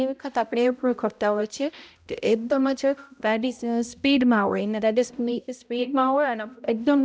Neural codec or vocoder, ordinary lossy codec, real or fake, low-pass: codec, 16 kHz, 0.5 kbps, X-Codec, HuBERT features, trained on balanced general audio; none; fake; none